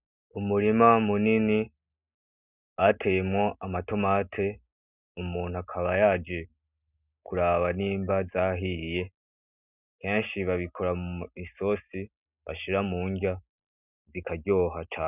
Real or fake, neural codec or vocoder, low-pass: real; none; 3.6 kHz